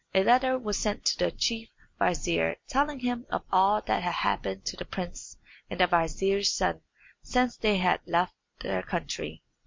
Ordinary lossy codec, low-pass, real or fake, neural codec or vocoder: MP3, 48 kbps; 7.2 kHz; real; none